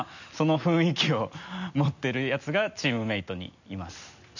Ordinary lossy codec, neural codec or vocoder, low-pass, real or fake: none; none; 7.2 kHz; real